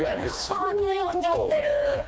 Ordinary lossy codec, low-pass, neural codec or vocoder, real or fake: none; none; codec, 16 kHz, 2 kbps, FreqCodec, smaller model; fake